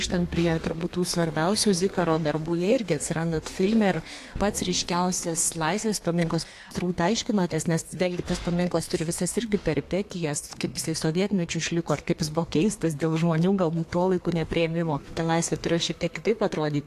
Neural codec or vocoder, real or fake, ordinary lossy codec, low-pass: codec, 32 kHz, 1.9 kbps, SNAC; fake; AAC, 64 kbps; 14.4 kHz